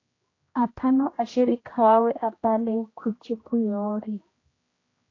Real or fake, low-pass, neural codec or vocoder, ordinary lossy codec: fake; 7.2 kHz; codec, 16 kHz, 1 kbps, X-Codec, HuBERT features, trained on general audio; AAC, 32 kbps